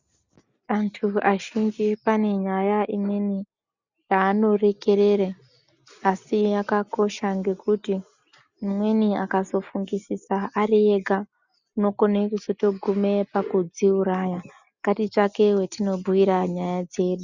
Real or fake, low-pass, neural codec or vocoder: real; 7.2 kHz; none